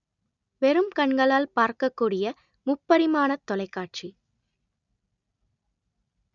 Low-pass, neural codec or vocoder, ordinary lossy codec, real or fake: 7.2 kHz; none; none; real